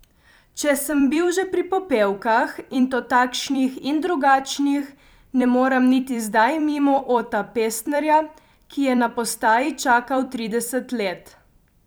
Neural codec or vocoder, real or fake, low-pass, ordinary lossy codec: vocoder, 44.1 kHz, 128 mel bands every 256 samples, BigVGAN v2; fake; none; none